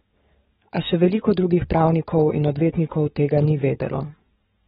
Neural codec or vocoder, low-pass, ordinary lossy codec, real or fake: codec, 44.1 kHz, 7.8 kbps, DAC; 19.8 kHz; AAC, 16 kbps; fake